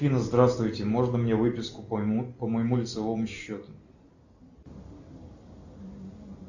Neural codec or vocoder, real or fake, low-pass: none; real; 7.2 kHz